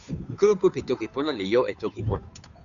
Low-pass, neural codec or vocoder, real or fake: 7.2 kHz; codec, 16 kHz, 2 kbps, FunCodec, trained on Chinese and English, 25 frames a second; fake